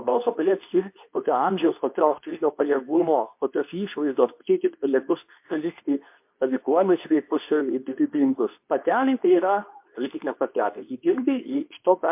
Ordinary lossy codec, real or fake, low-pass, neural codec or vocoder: MP3, 32 kbps; fake; 3.6 kHz; codec, 24 kHz, 0.9 kbps, WavTokenizer, medium speech release version 2